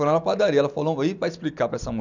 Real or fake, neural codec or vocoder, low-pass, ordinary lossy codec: real; none; 7.2 kHz; none